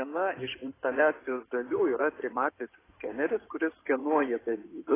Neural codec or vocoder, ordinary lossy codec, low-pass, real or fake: codec, 16 kHz in and 24 kHz out, 2.2 kbps, FireRedTTS-2 codec; AAC, 16 kbps; 3.6 kHz; fake